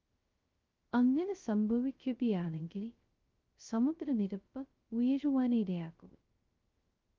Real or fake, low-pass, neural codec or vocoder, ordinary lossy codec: fake; 7.2 kHz; codec, 16 kHz, 0.2 kbps, FocalCodec; Opus, 24 kbps